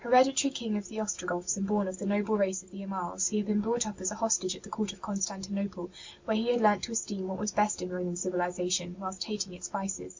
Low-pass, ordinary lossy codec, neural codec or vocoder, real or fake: 7.2 kHz; MP3, 64 kbps; none; real